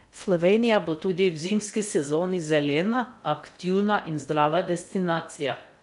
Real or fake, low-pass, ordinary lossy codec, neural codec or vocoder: fake; 10.8 kHz; none; codec, 16 kHz in and 24 kHz out, 0.6 kbps, FocalCodec, streaming, 2048 codes